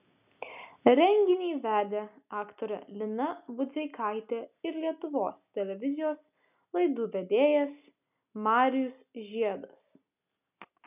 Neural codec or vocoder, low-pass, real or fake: none; 3.6 kHz; real